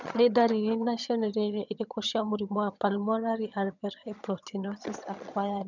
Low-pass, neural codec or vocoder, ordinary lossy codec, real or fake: 7.2 kHz; vocoder, 22.05 kHz, 80 mel bands, HiFi-GAN; none; fake